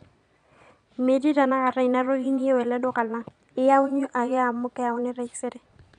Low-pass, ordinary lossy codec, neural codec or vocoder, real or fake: 9.9 kHz; none; vocoder, 22.05 kHz, 80 mel bands, Vocos; fake